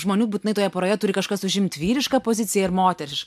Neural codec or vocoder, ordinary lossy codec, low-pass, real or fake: none; MP3, 96 kbps; 14.4 kHz; real